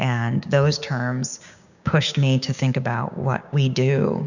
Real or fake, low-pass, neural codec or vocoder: fake; 7.2 kHz; codec, 16 kHz, 6 kbps, DAC